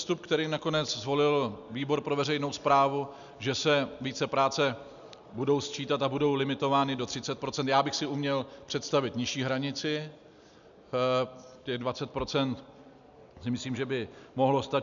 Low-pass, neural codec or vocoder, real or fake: 7.2 kHz; none; real